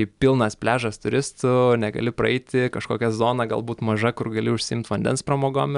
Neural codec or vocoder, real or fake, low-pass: none; real; 10.8 kHz